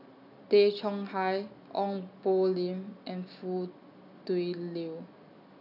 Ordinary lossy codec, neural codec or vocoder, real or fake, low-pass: none; none; real; 5.4 kHz